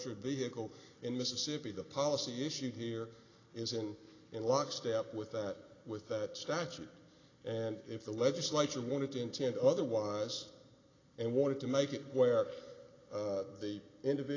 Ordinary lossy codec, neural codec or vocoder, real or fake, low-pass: AAC, 32 kbps; none; real; 7.2 kHz